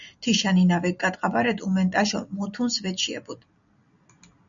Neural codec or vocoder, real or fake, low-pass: none; real; 7.2 kHz